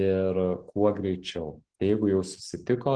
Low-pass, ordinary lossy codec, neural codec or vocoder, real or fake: 9.9 kHz; Opus, 16 kbps; none; real